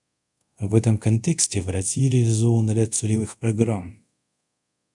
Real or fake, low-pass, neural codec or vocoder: fake; 10.8 kHz; codec, 24 kHz, 0.5 kbps, DualCodec